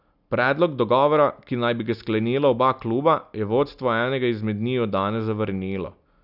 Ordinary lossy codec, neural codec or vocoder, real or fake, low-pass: none; none; real; 5.4 kHz